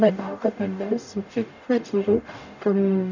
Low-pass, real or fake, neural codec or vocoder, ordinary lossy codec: 7.2 kHz; fake; codec, 44.1 kHz, 0.9 kbps, DAC; none